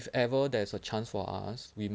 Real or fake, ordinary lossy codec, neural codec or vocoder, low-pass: real; none; none; none